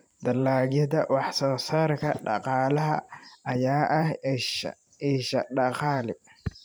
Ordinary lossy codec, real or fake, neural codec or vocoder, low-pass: none; fake; vocoder, 44.1 kHz, 128 mel bands every 512 samples, BigVGAN v2; none